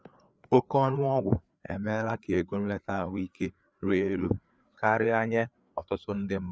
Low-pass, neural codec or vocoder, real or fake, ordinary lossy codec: none; codec, 16 kHz, 4 kbps, FreqCodec, larger model; fake; none